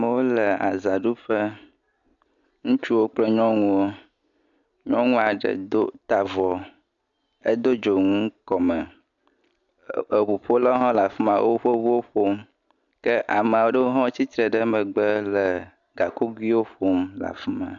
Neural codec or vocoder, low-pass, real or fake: none; 7.2 kHz; real